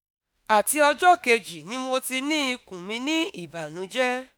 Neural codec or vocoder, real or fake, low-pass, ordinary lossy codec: autoencoder, 48 kHz, 32 numbers a frame, DAC-VAE, trained on Japanese speech; fake; none; none